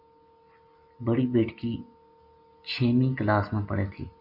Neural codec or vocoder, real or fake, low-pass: none; real; 5.4 kHz